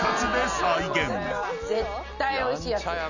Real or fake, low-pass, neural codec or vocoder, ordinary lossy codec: real; 7.2 kHz; none; none